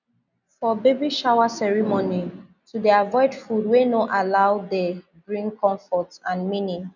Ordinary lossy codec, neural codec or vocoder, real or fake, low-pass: none; none; real; 7.2 kHz